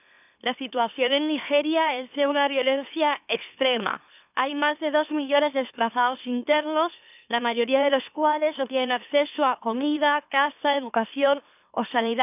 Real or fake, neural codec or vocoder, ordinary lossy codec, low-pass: fake; autoencoder, 44.1 kHz, a latent of 192 numbers a frame, MeloTTS; none; 3.6 kHz